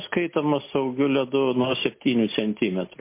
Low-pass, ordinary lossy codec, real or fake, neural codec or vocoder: 3.6 kHz; MP3, 24 kbps; real; none